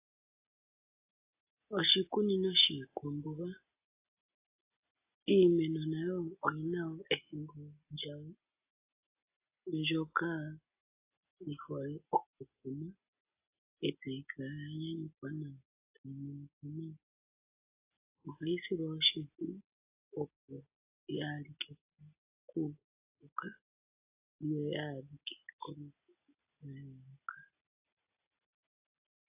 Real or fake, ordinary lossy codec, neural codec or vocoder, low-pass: real; AAC, 32 kbps; none; 3.6 kHz